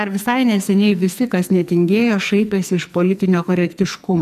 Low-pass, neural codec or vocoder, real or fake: 14.4 kHz; codec, 44.1 kHz, 2.6 kbps, SNAC; fake